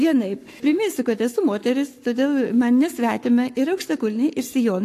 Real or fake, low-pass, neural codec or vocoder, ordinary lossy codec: fake; 14.4 kHz; codec, 44.1 kHz, 7.8 kbps, Pupu-Codec; AAC, 64 kbps